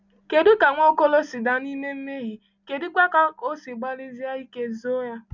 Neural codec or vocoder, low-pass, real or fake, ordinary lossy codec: none; 7.2 kHz; real; none